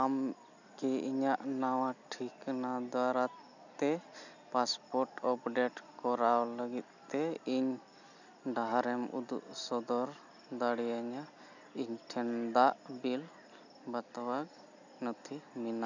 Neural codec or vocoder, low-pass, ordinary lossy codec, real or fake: none; 7.2 kHz; none; real